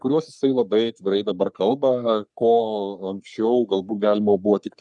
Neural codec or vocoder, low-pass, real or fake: codec, 44.1 kHz, 3.4 kbps, Pupu-Codec; 10.8 kHz; fake